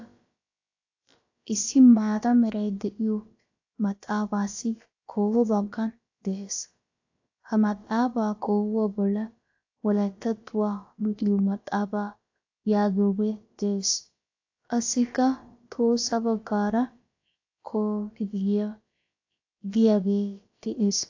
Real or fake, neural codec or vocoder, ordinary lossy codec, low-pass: fake; codec, 16 kHz, about 1 kbps, DyCAST, with the encoder's durations; AAC, 48 kbps; 7.2 kHz